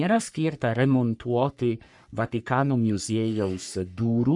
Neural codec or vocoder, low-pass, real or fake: codec, 44.1 kHz, 3.4 kbps, Pupu-Codec; 10.8 kHz; fake